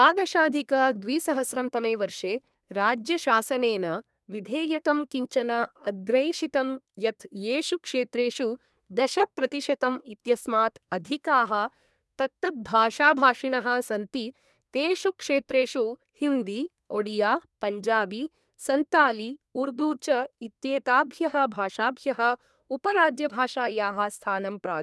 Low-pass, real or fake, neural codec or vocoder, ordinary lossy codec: none; fake; codec, 24 kHz, 1 kbps, SNAC; none